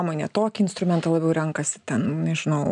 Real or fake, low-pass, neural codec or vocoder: real; 9.9 kHz; none